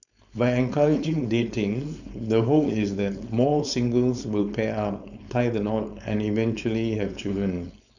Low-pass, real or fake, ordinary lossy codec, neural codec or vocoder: 7.2 kHz; fake; none; codec, 16 kHz, 4.8 kbps, FACodec